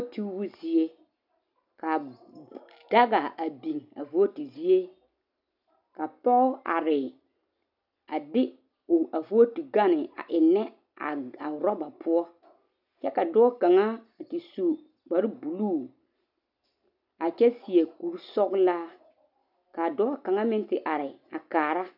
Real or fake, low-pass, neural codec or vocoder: real; 5.4 kHz; none